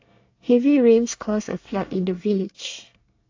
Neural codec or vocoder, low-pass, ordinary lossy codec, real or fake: codec, 24 kHz, 1 kbps, SNAC; 7.2 kHz; AAC, 48 kbps; fake